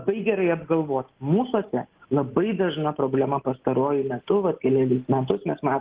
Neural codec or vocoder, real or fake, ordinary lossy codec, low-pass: none; real; Opus, 16 kbps; 3.6 kHz